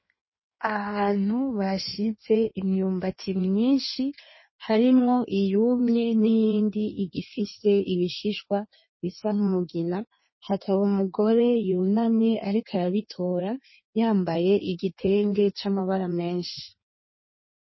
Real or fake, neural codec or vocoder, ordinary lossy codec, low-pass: fake; codec, 16 kHz in and 24 kHz out, 1.1 kbps, FireRedTTS-2 codec; MP3, 24 kbps; 7.2 kHz